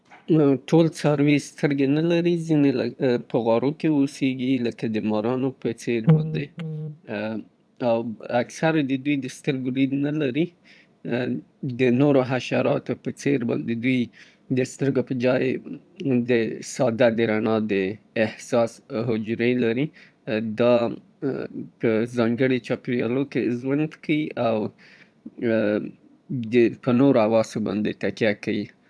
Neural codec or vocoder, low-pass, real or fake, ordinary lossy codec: vocoder, 22.05 kHz, 80 mel bands, Vocos; none; fake; none